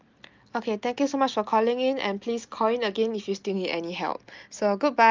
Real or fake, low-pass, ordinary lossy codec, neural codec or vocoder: real; 7.2 kHz; Opus, 24 kbps; none